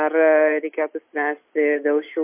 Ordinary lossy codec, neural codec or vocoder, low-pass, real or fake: MP3, 24 kbps; none; 3.6 kHz; real